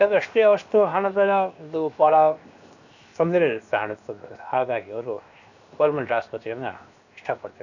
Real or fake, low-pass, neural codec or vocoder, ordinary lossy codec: fake; 7.2 kHz; codec, 16 kHz, 0.7 kbps, FocalCodec; none